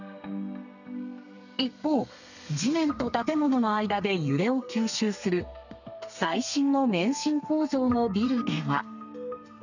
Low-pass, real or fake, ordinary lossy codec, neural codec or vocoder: 7.2 kHz; fake; none; codec, 32 kHz, 1.9 kbps, SNAC